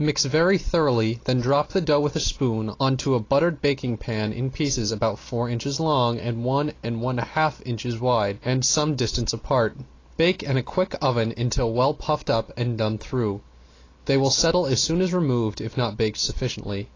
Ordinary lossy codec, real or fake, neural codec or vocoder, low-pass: AAC, 32 kbps; real; none; 7.2 kHz